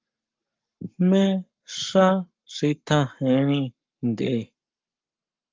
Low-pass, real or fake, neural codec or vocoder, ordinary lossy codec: 7.2 kHz; fake; codec, 44.1 kHz, 7.8 kbps, Pupu-Codec; Opus, 24 kbps